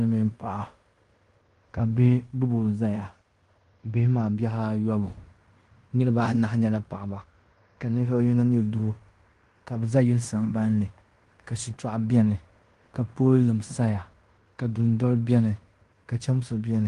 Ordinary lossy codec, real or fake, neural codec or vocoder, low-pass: Opus, 24 kbps; fake; codec, 16 kHz in and 24 kHz out, 0.9 kbps, LongCat-Audio-Codec, fine tuned four codebook decoder; 10.8 kHz